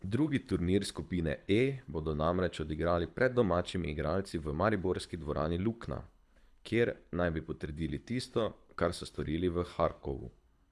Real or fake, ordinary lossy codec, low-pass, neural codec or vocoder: fake; none; none; codec, 24 kHz, 6 kbps, HILCodec